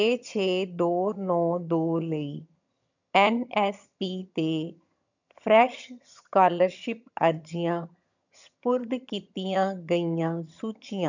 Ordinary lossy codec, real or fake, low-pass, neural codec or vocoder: none; fake; 7.2 kHz; vocoder, 22.05 kHz, 80 mel bands, HiFi-GAN